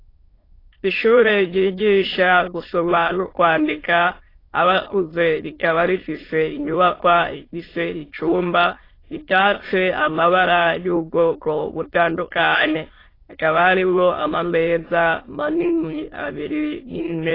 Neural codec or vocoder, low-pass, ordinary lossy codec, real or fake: autoencoder, 22.05 kHz, a latent of 192 numbers a frame, VITS, trained on many speakers; 5.4 kHz; AAC, 24 kbps; fake